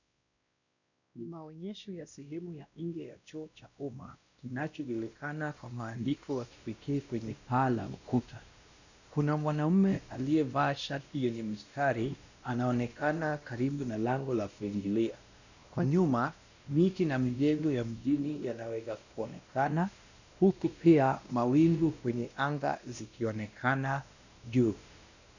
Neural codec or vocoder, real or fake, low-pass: codec, 16 kHz, 1 kbps, X-Codec, WavLM features, trained on Multilingual LibriSpeech; fake; 7.2 kHz